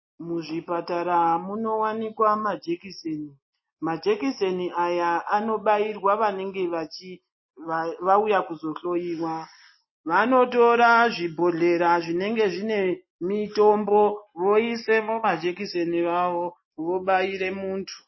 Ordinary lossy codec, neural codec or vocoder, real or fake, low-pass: MP3, 24 kbps; none; real; 7.2 kHz